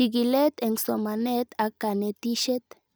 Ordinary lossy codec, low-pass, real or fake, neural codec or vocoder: none; none; real; none